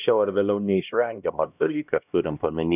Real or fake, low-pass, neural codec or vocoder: fake; 3.6 kHz; codec, 16 kHz, 1 kbps, X-Codec, HuBERT features, trained on LibriSpeech